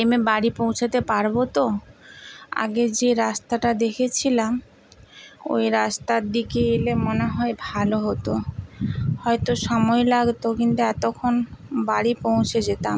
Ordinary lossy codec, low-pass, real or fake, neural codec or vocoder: none; none; real; none